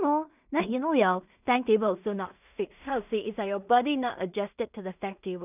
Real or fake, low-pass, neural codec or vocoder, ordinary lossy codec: fake; 3.6 kHz; codec, 16 kHz in and 24 kHz out, 0.4 kbps, LongCat-Audio-Codec, two codebook decoder; none